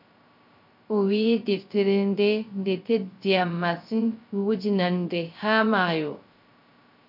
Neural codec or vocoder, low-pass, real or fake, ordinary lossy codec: codec, 16 kHz, 0.3 kbps, FocalCodec; 5.4 kHz; fake; MP3, 48 kbps